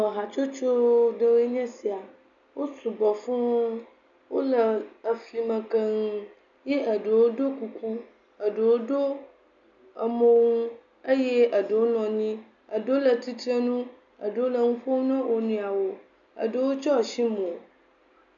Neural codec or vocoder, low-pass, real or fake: none; 7.2 kHz; real